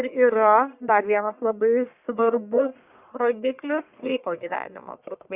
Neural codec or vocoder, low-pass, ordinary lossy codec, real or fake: codec, 44.1 kHz, 1.7 kbps, Pupu-Codec; 3.6 kHz; Opus, 64 kbps; fake